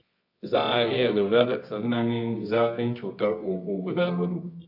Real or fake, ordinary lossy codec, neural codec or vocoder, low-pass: fake; AAC, 48 kbps; codec, 24 kHz, 0.9 kbps, WavTokenizer, medium music audio release; 5.4 kHz